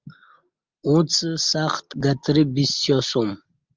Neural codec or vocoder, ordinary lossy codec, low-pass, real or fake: none; Opus, 32 kbps; 7.2 kHz; real